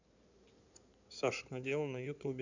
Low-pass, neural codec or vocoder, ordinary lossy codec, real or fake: 7.2 kHz; codec, 16 kHz in and 24 kHz out, 2.2 kbps, FireRedTTS-2 codec; none; fake